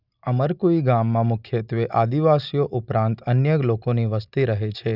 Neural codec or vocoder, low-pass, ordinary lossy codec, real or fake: none; 5.4 kHz; none; real